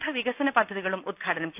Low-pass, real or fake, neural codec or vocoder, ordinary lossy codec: 3.6 kHz; real; none; none